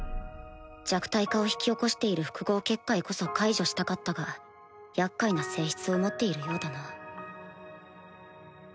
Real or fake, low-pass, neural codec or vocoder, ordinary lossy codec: real; none; none; none